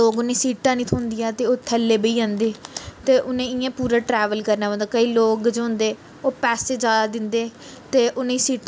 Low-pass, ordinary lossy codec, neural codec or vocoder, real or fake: none; none; none; real